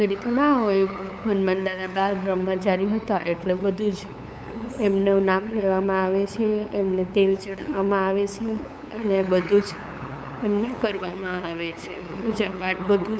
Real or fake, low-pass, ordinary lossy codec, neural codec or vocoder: fake; none; none; codec, 16 kHz, 8 kbps, FunCodec, trained on LibriTTS, 25 frames a second